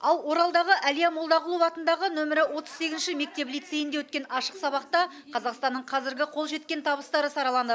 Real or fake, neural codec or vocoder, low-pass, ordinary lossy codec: real; none; none; none